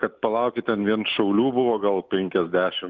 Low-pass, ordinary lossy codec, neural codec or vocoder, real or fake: 7.2 kHz; Opus, 24 kbps; none; real